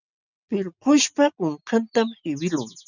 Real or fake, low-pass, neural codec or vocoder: real; 7.2 kHz; none